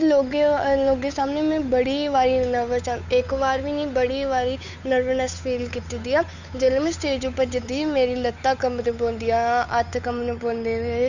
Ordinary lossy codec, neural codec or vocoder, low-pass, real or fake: none; codec, 16 kHz, 8 kbps, FunCodec, trained on Chinese and English, 25 frames a second; 7.2 kHz; fake